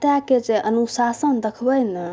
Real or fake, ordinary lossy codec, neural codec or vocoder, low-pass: real; none; none; none